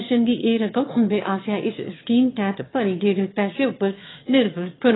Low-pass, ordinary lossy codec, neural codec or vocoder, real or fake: 7.2 kHz; AAC, 16 kbps; autoencoder, 22.05 kHz, a latent of 192 numbers a frame, VITS, trained on one speaker; fake